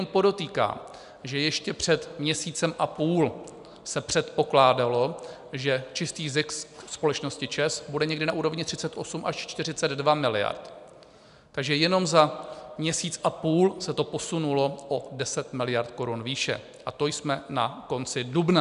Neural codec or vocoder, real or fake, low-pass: none; real; 10.8 kHz